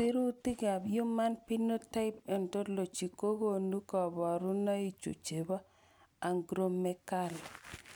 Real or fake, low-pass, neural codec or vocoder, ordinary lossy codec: real; none; none; none